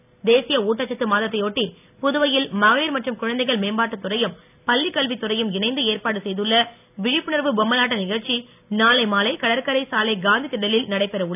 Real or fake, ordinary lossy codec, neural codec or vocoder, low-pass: real; none; none; 3.6 kHz